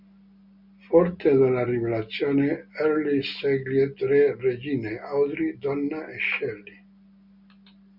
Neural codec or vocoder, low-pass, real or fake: none; 5.4 kHz; real